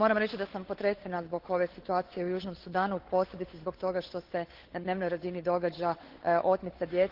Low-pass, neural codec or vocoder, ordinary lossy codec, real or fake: 5.4 kHz; codec, 16 kHz, 8 kbps, FunCodec, trained on Chinese and English, 25 frames a second; Opus, 16 kbps; fake